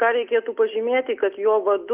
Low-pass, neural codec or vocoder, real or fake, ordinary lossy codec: 3.6 kHz; none; real; Opus, 32 kbps